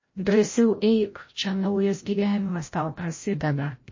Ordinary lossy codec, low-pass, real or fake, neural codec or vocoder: MP3, 32 kbps; 7.2 kHz; fake; codec, 16 kHz, 0.5 kbps, FreqCodec, larger model